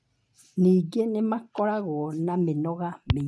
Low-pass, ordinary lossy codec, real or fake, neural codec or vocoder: none; none; real; none